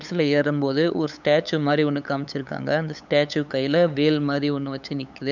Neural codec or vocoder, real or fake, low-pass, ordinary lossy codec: codec, 16 kHz, 8 kbps, FunCodec, trained on LibriTTS, 25 frames a second; fake; 7.2 kHz; none